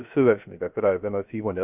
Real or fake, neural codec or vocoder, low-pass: fake; codec, 16 kHz, 0.3 kbps, FocalCodec; 3.6 kHz